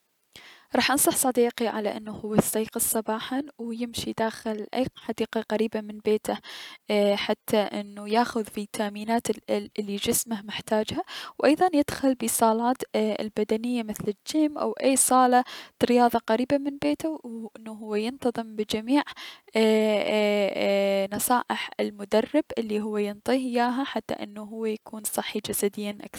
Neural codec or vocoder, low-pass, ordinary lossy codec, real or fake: none; 19.8 kHz; none; real